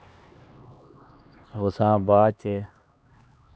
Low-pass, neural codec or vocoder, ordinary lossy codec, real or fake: none; codec, 16 kHz, 2 kbps, X-Codec, HuBERT features, trained on LibriSpeech; none; fake